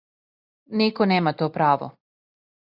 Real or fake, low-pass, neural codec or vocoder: real; 5.4 kHz; none